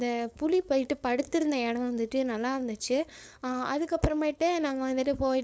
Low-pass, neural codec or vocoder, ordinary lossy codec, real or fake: none; codec, 16 kHz, 4.8 kbps, FACodec; none; fake